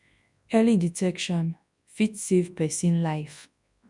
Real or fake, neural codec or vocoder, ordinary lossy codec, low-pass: fake; codec, 24 kHz, 0.9 kbps, WavTokenizer, large speech release; none; 10.8 kHz